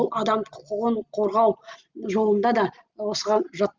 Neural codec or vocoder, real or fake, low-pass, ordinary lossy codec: none; real; 7.2 kHz; Opus, 32 kbps